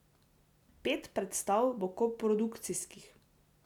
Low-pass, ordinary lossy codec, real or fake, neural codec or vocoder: 19.8 kHz; none; real; none